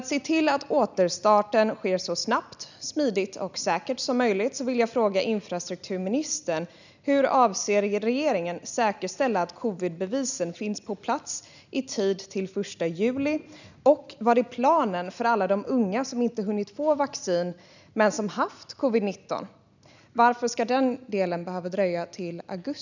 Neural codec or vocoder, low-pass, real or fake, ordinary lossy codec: none; 7.2 kHz; real; none